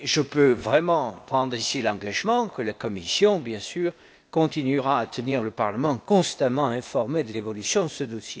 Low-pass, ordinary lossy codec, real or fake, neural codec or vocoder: none; none; fake; codec, 16 kHz, 0.8 kbps, ZipCodec